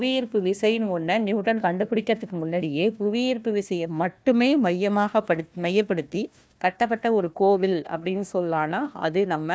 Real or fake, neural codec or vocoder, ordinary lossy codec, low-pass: fake; codec, 16 kHz, 1 kbps, FunCodec, trained on Chinese and English, 50 frames a second; none; none